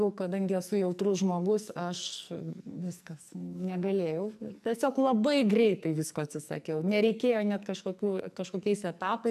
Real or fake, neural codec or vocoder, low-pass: fake; codec, 44.1 kHz, 2.6 kbps, SNAC; 14.4 kHz